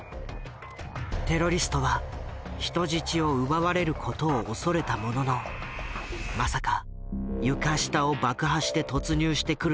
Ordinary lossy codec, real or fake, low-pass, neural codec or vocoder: none; real; none; none